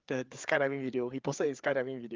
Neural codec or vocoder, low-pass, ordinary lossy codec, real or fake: codec, 16 kHz, 8 kbps, FreqCodec, larger model; 7.2 kHz; Opus, 32 kbps; fake